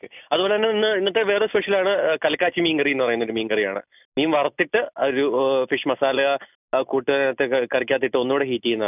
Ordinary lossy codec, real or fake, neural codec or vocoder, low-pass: none; real; none; 3.6 kHz